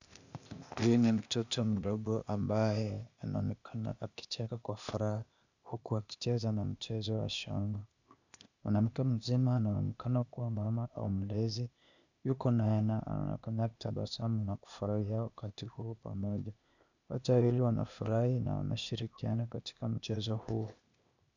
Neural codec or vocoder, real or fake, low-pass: codec, 16 kHz, 0.8 kbps, ZipCodec; fake; 7.2 kHz